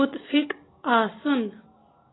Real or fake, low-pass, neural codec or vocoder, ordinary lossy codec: real; 7.2 kHz; none; AAC, 16 kbps